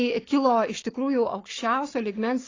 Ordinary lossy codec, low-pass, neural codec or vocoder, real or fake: AAC, 32 kbps; 7.2 kHz; codec, 44.1 kHz, 7.8 kbps, Pupu-Codec; fake